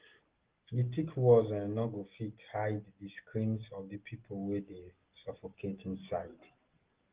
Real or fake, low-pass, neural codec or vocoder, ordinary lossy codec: real; 3.6 kHz; none; Opus, 16 kbps